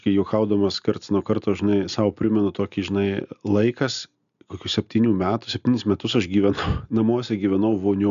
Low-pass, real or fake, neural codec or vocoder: 7.2 kHz; real; none